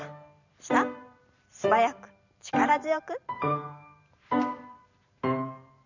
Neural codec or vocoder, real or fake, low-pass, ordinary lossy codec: vocoder, 44.1 kHz, 128 mel bands every 512 samples, BigVGAN v2; fake; 7.2 kHz; none